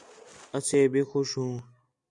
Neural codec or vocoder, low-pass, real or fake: none; 10.8 kHz; real